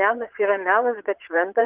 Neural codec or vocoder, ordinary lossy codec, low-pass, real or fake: vocoder, 22.05 kHz, 80 mel bands, Vocos; Opus, 32 kbps; 3.6 kHz; fake